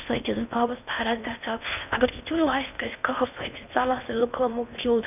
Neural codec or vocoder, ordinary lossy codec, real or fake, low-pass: codec, 16 kHz in and 24 kHz out, 0.6 kbps, FocalCodec, streaming, 4096 codes; none; fake; 3.6 kHz